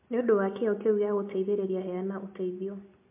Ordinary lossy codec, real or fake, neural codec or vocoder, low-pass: MP3, 24 kbps; real; none; 3.6 kHz